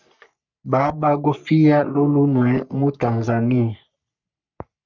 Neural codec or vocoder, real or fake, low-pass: codec, 44.1 kHz, 3.4 kbps, Pupu-Codec; fake; 7.2 kHz